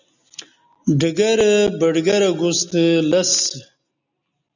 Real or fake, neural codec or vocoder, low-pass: real; none; 7.2 kHz